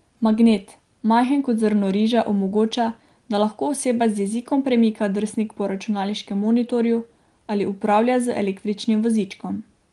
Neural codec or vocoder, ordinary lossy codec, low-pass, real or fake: none; Opus, 24 kbps; 10.8 kHz; real